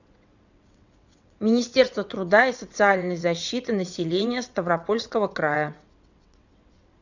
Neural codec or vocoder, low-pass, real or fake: vocoder, 22.05 kHz, 80 mel bands, WaveNeXt; 7.2 kHz; fake